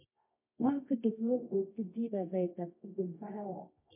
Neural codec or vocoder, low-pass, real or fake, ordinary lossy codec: codec, 24 kHz, 0.9 kbps, WavTokenizer, medium music audio release; 3.6 kHz; fake; MP3, 24 kbps